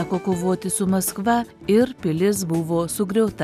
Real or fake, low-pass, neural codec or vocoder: real; 14.4 kHz; none